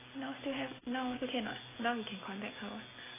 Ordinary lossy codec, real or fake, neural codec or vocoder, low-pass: AAC, 16 kbps; real; none; 3.6 kHz